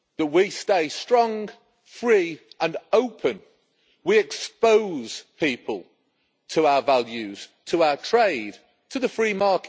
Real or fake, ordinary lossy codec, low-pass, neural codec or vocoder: real; none; none; none